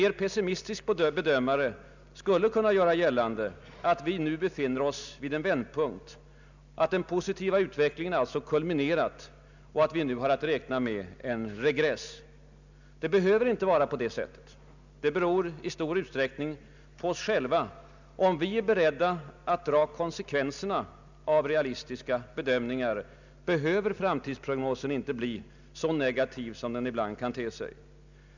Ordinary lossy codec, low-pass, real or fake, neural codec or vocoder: none; 7.2 kHz; real; none